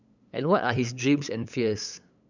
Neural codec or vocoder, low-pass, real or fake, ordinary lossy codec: codec, 16 kHz, 8 kbps, FunCodec, trained on LibriTTS, 25 frames a second; 7.2 kHz; fake; none